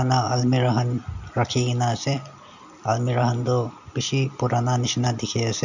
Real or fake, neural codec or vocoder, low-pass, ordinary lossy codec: real; none; 7.2 kHz; none